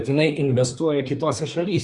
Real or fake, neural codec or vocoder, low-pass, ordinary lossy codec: fake; codec, 24 kHz, 1 kbps, SNAC; 10.8 kHz; Opus, 64 kbps